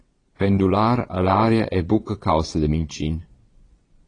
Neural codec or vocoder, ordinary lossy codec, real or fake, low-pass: vocoder, 22.05 kHz, 80 mel bands, Vocos; AAC, 32 kbps; fake; 9.9 kHz